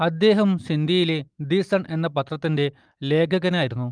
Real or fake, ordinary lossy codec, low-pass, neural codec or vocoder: real; Opus, 32 kbps; 9.9 kHz; none